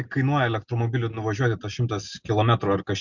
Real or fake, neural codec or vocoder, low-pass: real; none; 7.2 kHz